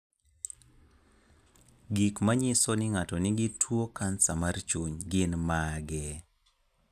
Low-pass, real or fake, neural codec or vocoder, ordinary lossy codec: 14.4 kHz; real; none; none